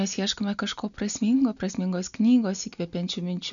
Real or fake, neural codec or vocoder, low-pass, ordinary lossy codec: real; none; 7.2 kHz; MP3, 96 kbps